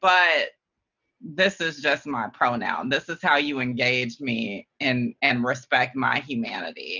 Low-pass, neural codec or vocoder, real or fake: 7.2 kHz; none; real